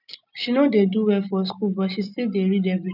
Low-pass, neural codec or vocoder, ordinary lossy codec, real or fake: 5.4 kHz; none; none; real